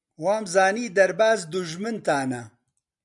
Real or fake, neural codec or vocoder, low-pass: real; none; 10.8 kHz